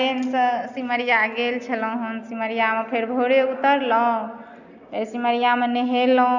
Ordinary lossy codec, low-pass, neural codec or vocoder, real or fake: none; 7.2 kHz; none; real